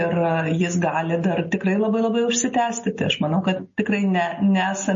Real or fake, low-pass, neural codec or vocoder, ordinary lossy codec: real; 7.2 kHz; none; MP3, 32 kbps